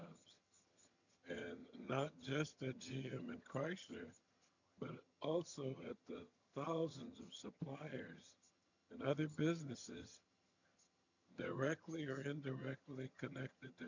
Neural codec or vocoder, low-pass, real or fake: vocoder, 22.05 kHz, 80 mel bands, HiFi-GAN; 7.2 kHz; fake